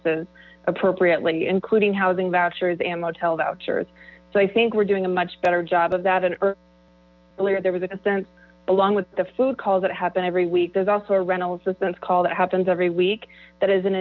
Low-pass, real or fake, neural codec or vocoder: 7.2 kHz; real; none